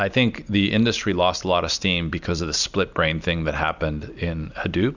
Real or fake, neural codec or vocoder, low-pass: real; none; 7.2 kHz